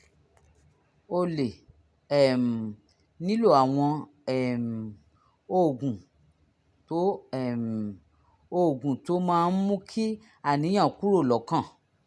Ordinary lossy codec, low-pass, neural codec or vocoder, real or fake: none; none; none; real